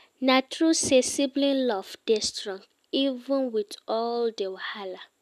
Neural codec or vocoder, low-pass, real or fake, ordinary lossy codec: none; 14.4 kHz; real; none